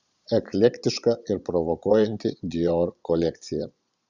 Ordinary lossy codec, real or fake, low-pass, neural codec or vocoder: Opus, 64 kbps; fake; 7.2 kHz; vocoder, 22.05 kHz, 80 mel bands, Vocos